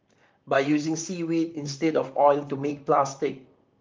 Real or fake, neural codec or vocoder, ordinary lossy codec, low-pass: fake; vocoder, 44.1 kHz, 128 mel bands, Pupu-Vocoder; Opus, 24 kbps; 7.2 kHz